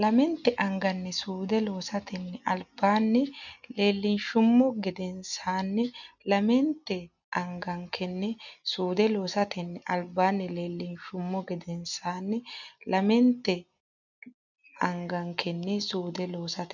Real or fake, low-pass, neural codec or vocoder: real; 7.2 kHz; none